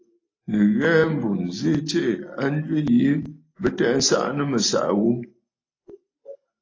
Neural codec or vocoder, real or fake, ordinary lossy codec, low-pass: none; real; AAC, 32 kbps; 7.2 kHz